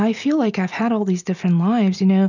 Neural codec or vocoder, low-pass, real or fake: none; 7.2 kHz; real